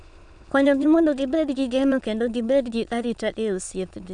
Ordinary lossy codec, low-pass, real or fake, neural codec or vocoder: none; 9.9 kHz; fake; autoencoder, 22.05 kHz, a latent of 192 numbers a frame, VITS, trained on many speakers